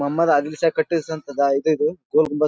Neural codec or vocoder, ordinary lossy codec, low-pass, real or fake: none; none; none; real